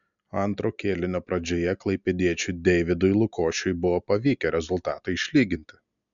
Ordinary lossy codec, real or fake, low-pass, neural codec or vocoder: MP3, 96 kbps; real; 7.2 kHz; none